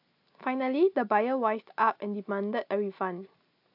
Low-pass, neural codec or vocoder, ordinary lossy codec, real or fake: 5.4 kHz; none; AAC, 48 kbps; real